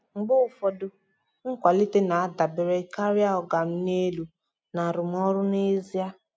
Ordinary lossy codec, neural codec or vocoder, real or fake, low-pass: none; none; real; none